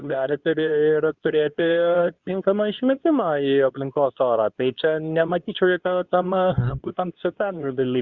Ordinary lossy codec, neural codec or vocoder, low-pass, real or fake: Opus, 64 kbps; codec, 24 kHz, 0.9 kbps, WavTokenizer, medium speech release version 2; 7.2 kHz; fake